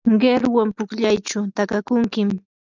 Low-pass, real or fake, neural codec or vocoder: 7.2 kHz; real; none